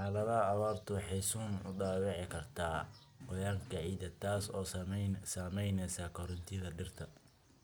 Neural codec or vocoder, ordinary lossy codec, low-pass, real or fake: none; none; none; real